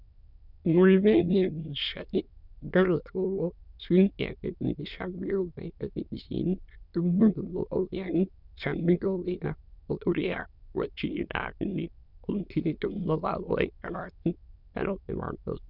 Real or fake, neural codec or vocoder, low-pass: fake; autoencoder, 22.05 kHz, a latent of 192 numbers a frame, VITS, trained on many speakers; 5.4 kHz